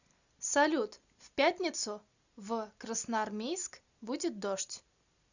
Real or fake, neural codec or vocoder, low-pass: real; none; 7.2 kHz